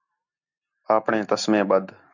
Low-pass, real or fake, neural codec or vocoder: 7.2 kHz; real; none